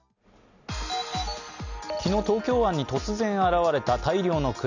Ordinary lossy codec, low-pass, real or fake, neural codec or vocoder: none; 7.2 kHz; real; none